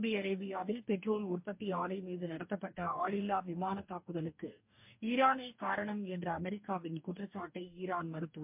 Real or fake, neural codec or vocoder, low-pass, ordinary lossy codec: fake; codec, 44.1 kHz, 2.6 kbps, DAC; 3.6 kHz; MP3, 32 kbps